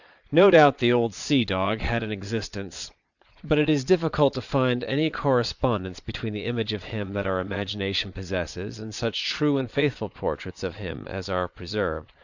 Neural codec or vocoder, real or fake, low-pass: vocoder, 22.05 kHz, 80 mel bands, Vocos; fake; 7.2 kHz